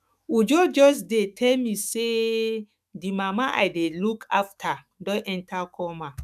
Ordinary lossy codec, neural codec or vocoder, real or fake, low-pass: none; autoencoder, 48 kHz, 128 numbers a frame, DAC-VAE, trained on Japanese speech; fake; 14.4 kHz